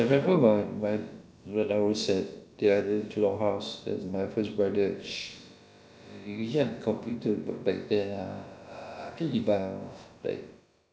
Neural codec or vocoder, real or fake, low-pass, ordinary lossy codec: codec, 16 kHz, about 1 kbps, DyCAST, with the encoder's durations; fake; none; none